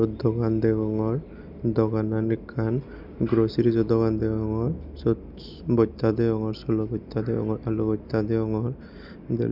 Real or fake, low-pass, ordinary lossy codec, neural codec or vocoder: real; 5.4 kHz; none; none